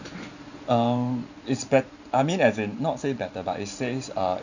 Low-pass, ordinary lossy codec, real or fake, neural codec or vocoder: 7.2 kHz; none; fake; vocoder, 44.1 kHz, 128 mel bands every 512 samples, BigVGAN v2